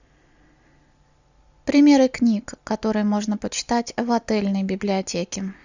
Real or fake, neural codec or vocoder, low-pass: real; none; 7.2 kHz